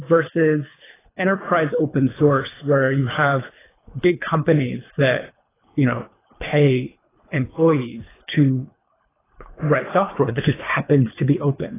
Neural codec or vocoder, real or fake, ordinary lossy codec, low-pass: codec, 24 kHz, 3 kbps, HILCodec; fake; AAC, 16 kbps; 3.6 kHz